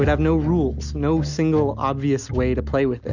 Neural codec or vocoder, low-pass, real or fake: none; 7.2 kHz; real